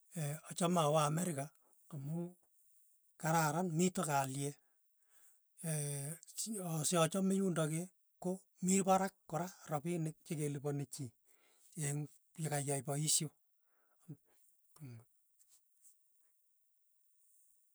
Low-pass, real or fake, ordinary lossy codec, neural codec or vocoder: none; real; none; none